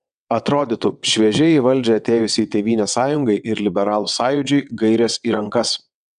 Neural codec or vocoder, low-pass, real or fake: vocoder, 22.05 kHz, 80 mel bands, WaveNeXt; 9.9 kHz; fake